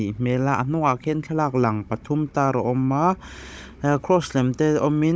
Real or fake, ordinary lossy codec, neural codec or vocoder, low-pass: fake; none; codec, 16 kHz, 16 kbps, FunCodec, trained on Chinese and English, 50 frames a second; none